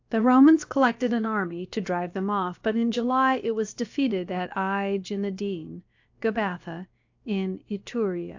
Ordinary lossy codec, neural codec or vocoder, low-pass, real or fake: AAC, 48 kbps; codec, 16 kHz, about 1 kbps, DyCAST, with the encoder's durations; 7.2 kHz; fake